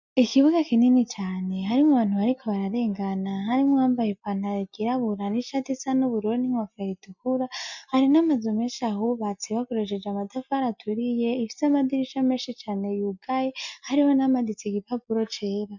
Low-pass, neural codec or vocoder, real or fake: 7.2 kHz; none; real